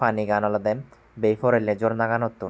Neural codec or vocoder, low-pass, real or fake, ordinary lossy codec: none; none; real; none